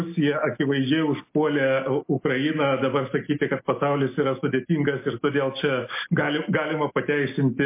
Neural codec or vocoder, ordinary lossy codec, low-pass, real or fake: none; MP3, 24 kbps; 3.6 kHz; real